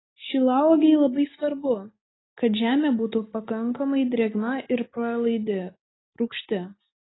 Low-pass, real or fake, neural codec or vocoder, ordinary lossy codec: 7.2 kHz; real; none; AAC, 16 kbps